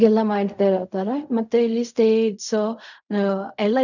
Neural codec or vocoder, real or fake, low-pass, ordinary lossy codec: codec, 16 kHz in and 24 kHz out, 0.4 kbps, LongCat-Audio-Codec, fine tuned four codebook decoder; fake; 7.2 kHz; none